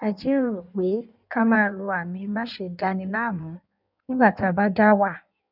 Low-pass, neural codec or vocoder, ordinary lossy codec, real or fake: 5.4 kHz; codec, 16 kHz in and 24 kHz out, 1.1 kbps, FireRedTTS-2 codec; none; fake